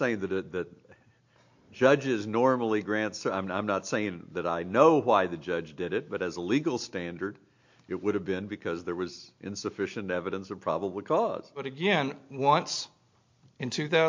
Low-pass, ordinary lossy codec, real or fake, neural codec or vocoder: 7.2 kHz; MP3, 48 kbps; real; none